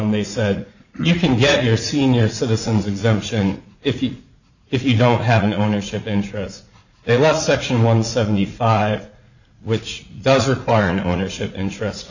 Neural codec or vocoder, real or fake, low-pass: vocoder, 44.1 kHz, 80 mel bands, Vocos; fake; 7.2 kHz